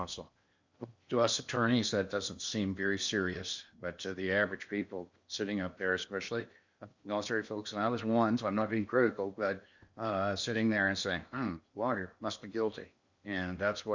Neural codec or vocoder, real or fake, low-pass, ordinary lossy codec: codec, 16 kHz in and 24 kHz out, 0.8 kbps, FocalCodec, streaming, 65536 codes; fake; 7.2 kHz; Opus, 64 kbps